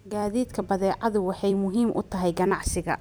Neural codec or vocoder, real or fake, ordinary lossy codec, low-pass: vocoder, 44.1 kHz, 128 mel bands every 256 samples, BigVGAN v2; fake; none; none